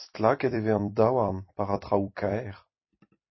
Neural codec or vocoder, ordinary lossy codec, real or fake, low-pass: vocoder, 44.1 kHz, 128 mel bands every 256 samples, BigVGAN v2; MP3, 24 kbps; fake; 7.2 kHz